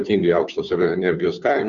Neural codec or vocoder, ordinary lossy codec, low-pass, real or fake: codec, 16 kHz, 2 kbps, FunCodec, trained on Chinese and English, 25 frames a second; Opus, 64 kbps; 7.2 kHz; fake